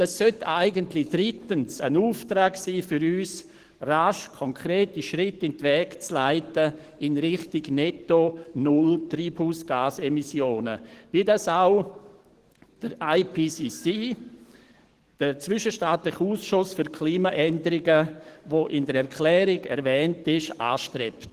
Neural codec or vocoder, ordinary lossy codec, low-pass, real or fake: codec, 44.1 kHz, 7.8 kbps, DAC; Opus, 16 kbps; 14.4 kHz; fake